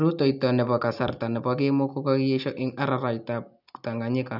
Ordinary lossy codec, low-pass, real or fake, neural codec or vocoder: none; 5.4 kHz; real; none